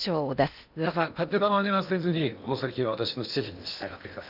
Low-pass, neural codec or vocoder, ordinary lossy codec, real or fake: 5.4 kHz; codec, 16 kHz in and 24 kHz out, 0.8 kbps, FocalCodec, streaming, 65536 codes; none; fake